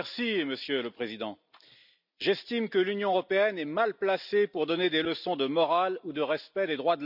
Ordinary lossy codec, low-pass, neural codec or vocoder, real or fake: none; 5.4 kHz; none; real